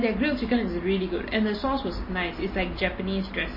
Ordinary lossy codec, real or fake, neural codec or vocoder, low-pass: MP3, 24 kbps; real; none; 5.4 kHz